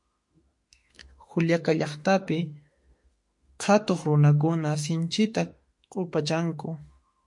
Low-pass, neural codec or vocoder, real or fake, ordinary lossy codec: 10.8 kHz; autoencoder, 48 kHz, 32 numbers a frame, DAC-VAE, trained on Japanese speech; fake; MP3, 48 kbps